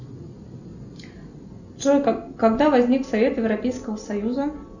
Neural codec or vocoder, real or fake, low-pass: none; real; 7.2 kHz